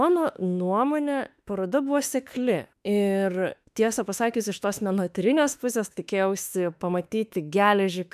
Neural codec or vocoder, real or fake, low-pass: autoencoder, 48 kHz, 32 numbers a frame, DAC-VAE, trained on Japanese speech; fake; 14.4 kHz